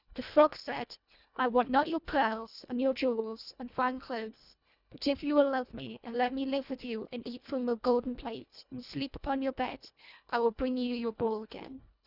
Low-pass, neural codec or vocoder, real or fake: 5.4 kHz; codec, 24 kHz, 1.5 kbps, HILCodec; fake